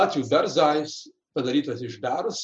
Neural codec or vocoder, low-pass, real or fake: none; 9.9 kHz; real